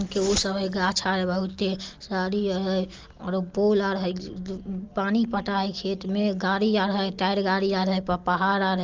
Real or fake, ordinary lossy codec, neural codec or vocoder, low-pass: real; Opus, 24 kbps; none; 7.2 kHz